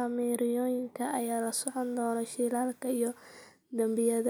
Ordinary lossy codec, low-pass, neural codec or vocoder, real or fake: none; none; none; real